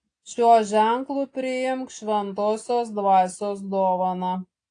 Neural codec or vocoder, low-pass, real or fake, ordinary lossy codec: none; 9.9 kHz; real; AAC, 48 kbps